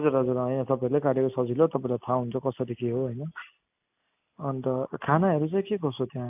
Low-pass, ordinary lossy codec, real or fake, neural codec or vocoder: 3.6 kHz; AAC, 32 kbps; real; none